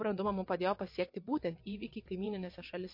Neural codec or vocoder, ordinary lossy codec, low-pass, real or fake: vocoder, 22.05 kHz, 80 mel bands, WaveNeXt; MP3, 32 kbps; 5.4 kHz; fake